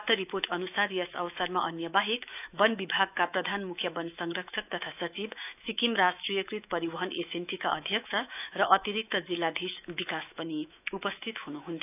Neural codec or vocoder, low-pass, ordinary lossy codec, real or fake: autoencoder, 48 kHz, 128 numbers a frame, DAC-VAE, trained on Japanese speech; 3.6 kHz; none; fake